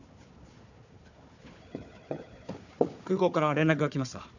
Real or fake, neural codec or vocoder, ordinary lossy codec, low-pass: fake; codec, 16 kHz, 4 kbps, FunCodec, trained on Chinese and English, 50 frames a second; none; 7.2 kHz